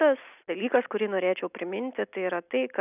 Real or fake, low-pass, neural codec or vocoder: real; 3.6 kHz; none